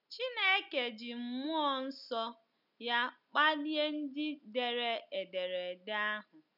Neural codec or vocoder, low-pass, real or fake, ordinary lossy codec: none; 5.4 kHz; real; none